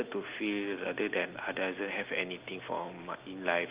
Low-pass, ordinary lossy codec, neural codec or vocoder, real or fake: 3.6 kHz; Opus, 24 kbps; none; real